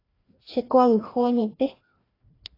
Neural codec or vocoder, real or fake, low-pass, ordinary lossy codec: codec, 16 kHz, 1 kbps, FreqCodec, larger model; fake; 5.4 kHz; AAC, 24 kbps